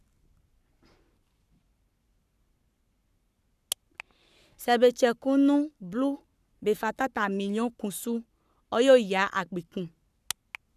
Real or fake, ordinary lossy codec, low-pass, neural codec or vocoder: fake; none; 14.4 kHz; codec, 44.1 kHz, 7.8 kbps, Pupu-Codec